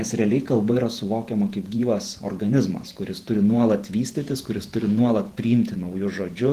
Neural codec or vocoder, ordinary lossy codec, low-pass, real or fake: vocoder, 48 kHz, 128 mel bands, Vocos; Opus, 16 kbps; 14.4 kHz; fake